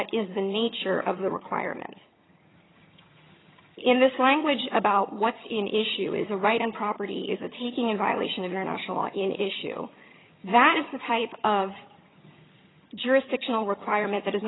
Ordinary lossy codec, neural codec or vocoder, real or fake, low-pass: AAC, 16 kbps; vocoder, 22.05 kHz, 80 mel bands, HiFi-GAN; fake; 7.2 kHz